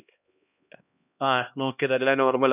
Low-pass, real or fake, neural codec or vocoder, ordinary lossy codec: 3.6 kHz; fake; codec, 16 kHz, 1 kbps, X-Codec, HuBERT features, trained on LibriSpeech; none